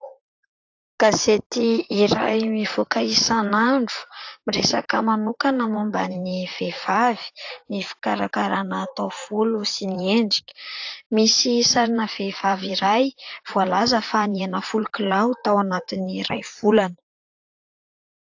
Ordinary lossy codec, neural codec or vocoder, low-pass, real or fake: AAC, 48 kbps; vocoder, 44.1 kHz, 128 mel bands, Pupu-Vocoder; 7.2 kHz; fake